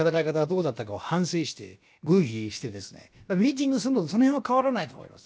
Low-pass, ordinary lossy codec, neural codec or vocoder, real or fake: none; none; codec, 16 kHz, about 1 kbps, DyCAST, with the encoder's durations; fake